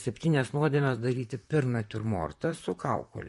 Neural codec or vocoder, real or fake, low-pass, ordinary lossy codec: vocoder, 44.1 kHz, 128 mel bands, Pupu-Vocoder; fake; 14.4 kHz; MP3, 48 kbps